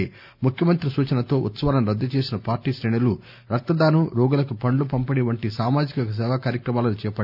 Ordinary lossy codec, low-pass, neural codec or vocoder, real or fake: none; 5.4 kHz; none; real